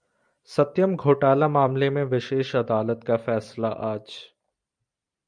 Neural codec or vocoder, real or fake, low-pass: vocoder, 44.1 kHz, 128 mel bands every 256 samples, BigVGAN v2; fake; 9.9 kHz